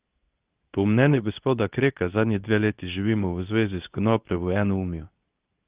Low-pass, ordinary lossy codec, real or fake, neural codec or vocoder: 3.6 kHz; Opus, 32 kbps; fake; codec, 24 kHz, 0.9 kbps, WavTokenizer, medium speech release version 2